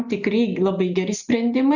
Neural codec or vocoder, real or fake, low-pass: none; real; 7.2 kHz